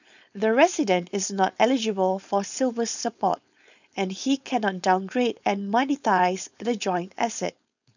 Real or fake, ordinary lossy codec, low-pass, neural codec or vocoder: fake; none; 7.2 kHz; codec, 16 kHz, 4.8 kbps, FACodec